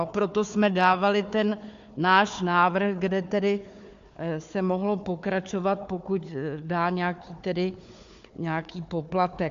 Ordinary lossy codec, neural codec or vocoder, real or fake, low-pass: AAC, 64 kbps; codec, 16 kHz, 4 kbps, FunCodec, trained on Chinese and English, 50 frames a second; fake; 7.2 kHz